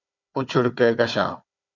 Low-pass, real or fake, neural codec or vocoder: 7.2 kHz; fake; codec, 16 kHz, 4 kbps, FunCodec, trained on Chinese and English, 50 frames a second